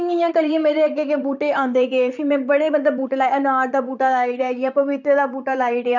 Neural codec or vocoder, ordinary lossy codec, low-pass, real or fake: vocoder, 44.1 kHz, 128 mel bands, Pupu-Vocoder; none; 7.2 kHz; fake